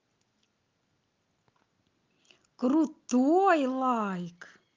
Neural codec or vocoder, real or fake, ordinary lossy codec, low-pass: none; real; Opus, 32 kbps; 7.2 kHz